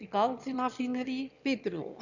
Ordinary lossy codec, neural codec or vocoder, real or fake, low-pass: none; autoencoder, 22.05 kHz, a latent of 192 numbers a frame, VITS, trained on one speaker; fake; 7.2 kHz